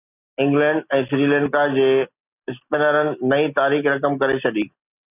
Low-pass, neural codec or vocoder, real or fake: 3.6 kHz; none; real